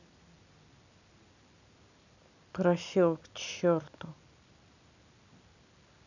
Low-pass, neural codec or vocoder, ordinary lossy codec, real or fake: 7.2 kHz; none; none; real